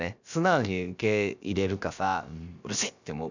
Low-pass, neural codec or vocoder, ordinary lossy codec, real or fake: 7.2 kHz; codec, 16 kHz, about 1 kbps, DyCAST, with the encoder's durations; none; fake